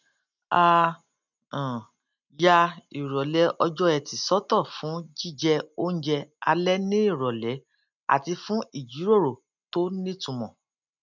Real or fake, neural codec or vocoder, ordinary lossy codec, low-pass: real; none; none; 7.2 kHz